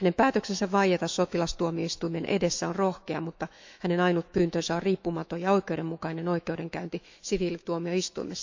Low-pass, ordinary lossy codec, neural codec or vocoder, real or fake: 7.2 kHz; MP3, 64 kbps; autoencoder, 48 kHz, 128 numbers a frame, DAC-VAE, trained on Japanese speech; fake